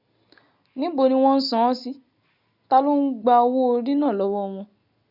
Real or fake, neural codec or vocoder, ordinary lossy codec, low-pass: real; none; none; 5.4 kHz